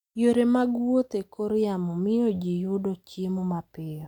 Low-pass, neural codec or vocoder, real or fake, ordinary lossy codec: 19.8 kHz; none; real; none